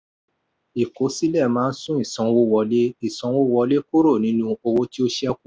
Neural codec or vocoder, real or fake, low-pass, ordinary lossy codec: none; real; none; none